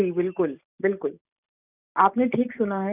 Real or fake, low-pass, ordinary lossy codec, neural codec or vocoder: real; 3.6 kHz; none; none